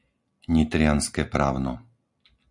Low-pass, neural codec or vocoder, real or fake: 10.8 kHz; none; real